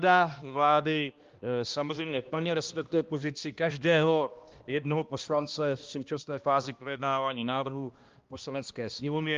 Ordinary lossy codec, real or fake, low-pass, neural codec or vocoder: Opus, 32 kbps; fake; 7.2 kHz; codec, 16 kHz, 1 kbps, X-Codec, HuBERT features, trained on balanced general audio